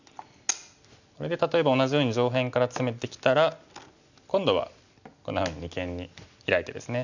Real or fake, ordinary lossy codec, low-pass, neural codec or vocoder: real; none; 7.2 kHz; none